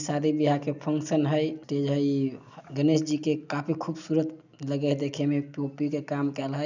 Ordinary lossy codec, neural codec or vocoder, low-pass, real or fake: none; none; 7.2 kHz; real